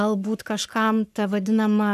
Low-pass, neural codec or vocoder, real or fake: 14.4 kHz; codec, 44.1 kHz, 7.8 kbps, Pupu-Codec; fake